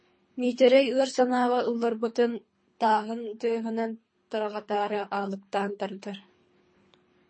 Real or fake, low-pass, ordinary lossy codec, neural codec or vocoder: fake; 9.9 kHz; MP3, 32 kbps; codec, 24 kHz, 3 kbps, HILCodec